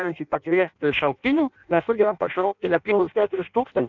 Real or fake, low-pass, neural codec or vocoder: fake; 7.2 kHz; codec, 16 kHz in and 24 kHz out, 0.6 kbps, FireRedTTS-2 codec